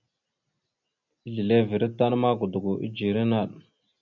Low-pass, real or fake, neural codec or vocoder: 7.2 kHz; real; none